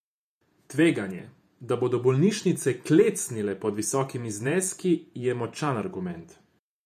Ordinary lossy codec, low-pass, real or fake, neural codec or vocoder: MP3, 96 kbps; 14.4 kHz; fake; vocoder, 48 kHz, 128 mel bands, Vocos